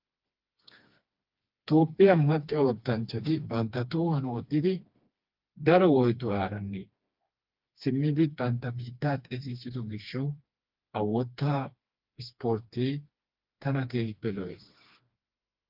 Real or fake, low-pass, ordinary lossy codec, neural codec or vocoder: fake; 5.4 kHz; Opus, 24 kbps; codec, 16 kHz, 2 kbps, FreqCodec, smaller model